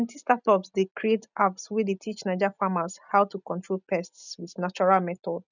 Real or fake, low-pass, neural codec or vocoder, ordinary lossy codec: real; 7.2 kHz; none; none